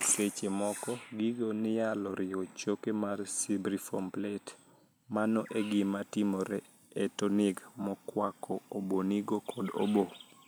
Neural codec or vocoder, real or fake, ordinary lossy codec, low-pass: none; real; none; none